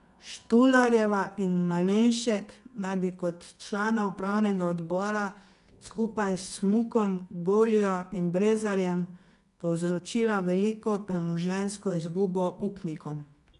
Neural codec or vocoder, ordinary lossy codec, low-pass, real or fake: codec, 24 kHz, 0.9 kbps, WavTokenizer, medium music audio release; none; 10.8 kHz; fake